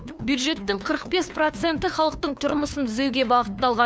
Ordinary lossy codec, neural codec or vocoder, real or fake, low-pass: none; codec, 16 kHz, 2 kbps, FunCodec, trained on LibriTTS, 25 frames a second; fake; none